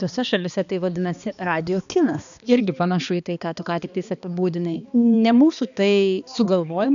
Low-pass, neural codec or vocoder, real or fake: 7.2 kHz; codec, 16 kHz, 2 kbps, X-Codec, HuBERT features, trained on balanced general audio; fake